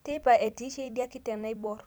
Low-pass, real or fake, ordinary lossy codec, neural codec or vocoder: none; fake; none; vocoder, 44.1 kHz, 128 mel bands every 256 samples, BigVGAN v2